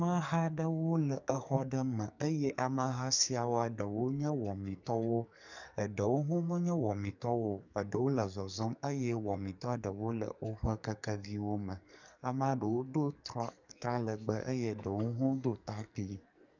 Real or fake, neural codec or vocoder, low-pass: fake; codec, 44.1 kHz, 2.6 kbps, SNAC; 7.2 kHz